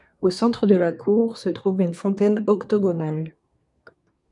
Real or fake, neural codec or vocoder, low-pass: fake; codec, 24 kHz, 1 kbps, SNAC; 10.8 kHz